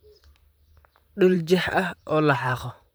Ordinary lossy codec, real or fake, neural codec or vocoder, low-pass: none; fake; vocoder, 44.1 kHz, 128 mel bands, Pupu-Vocoder; none